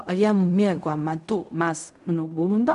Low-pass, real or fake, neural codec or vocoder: 10.8 kHz; fake; codec, 16 kHz in and 24 kHz out, 0.4 kbps, LongCat-Audio-Codec, fine tuned four codebook decoder